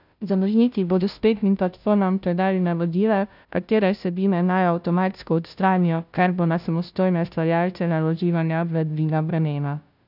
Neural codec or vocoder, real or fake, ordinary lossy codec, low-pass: codec, 16 kHz, 0.5 kbps, FunCodec, trained on Chinese and English, 25 frames a second; fake; none; 5.4 kHz